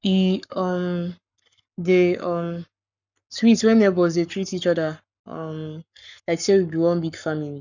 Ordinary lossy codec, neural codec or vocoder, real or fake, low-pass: none; codec, 44.1 kHz, 7.8 kbps, Pupu-Codec; fake; 7.2 kHz